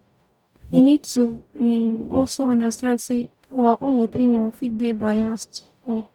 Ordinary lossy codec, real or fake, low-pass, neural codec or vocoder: none; fake; 19.8 kHz; codec, 44.1 kHz, 0.9 kbps, DAC